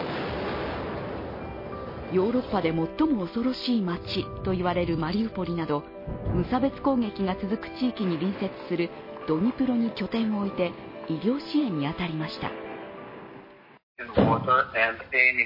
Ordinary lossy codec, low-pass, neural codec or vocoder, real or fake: MP3, 24 kbps; 5.4 kHz; none; real